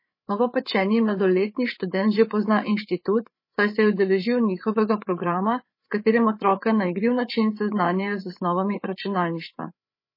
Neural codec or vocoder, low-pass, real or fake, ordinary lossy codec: codec, 16 kHz, 8 kbps, FreqCodec, larger model; 5.4 kHz; fake; MP3, 24 kbps